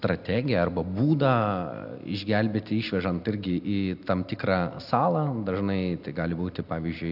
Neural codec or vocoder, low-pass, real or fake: none; 5.4 kHz; real